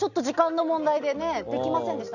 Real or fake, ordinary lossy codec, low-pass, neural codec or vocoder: real; none; 7.2 kHz; none